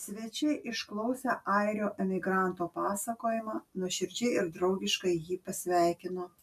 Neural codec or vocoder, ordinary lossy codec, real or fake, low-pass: none; MP3, 96 kbps; real; 14.4 kHz